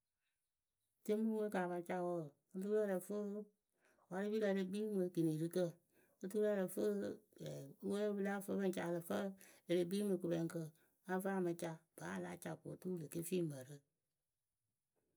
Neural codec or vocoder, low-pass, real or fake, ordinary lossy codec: none; none; real; none